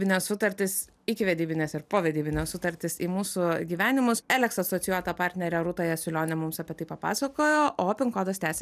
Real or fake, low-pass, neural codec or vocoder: real; 14.4 kHz; none